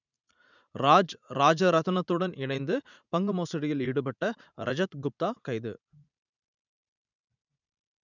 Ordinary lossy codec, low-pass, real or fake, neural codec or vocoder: none; 7.2 kHz; fake; vocoder, 44.1 kHz, 80 mel bands, Vocos